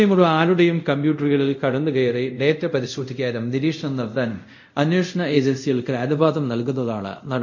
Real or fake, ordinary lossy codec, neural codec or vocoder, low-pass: fake; MP3, 48 kbps; codec, 24 kHz, 0.5 kbps, DualCodec; 7.2 kHz